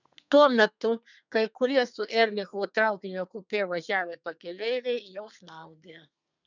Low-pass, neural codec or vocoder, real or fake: 7.2 kHz; codec, 32 kHz, 1.9 kbps, SNAC; fake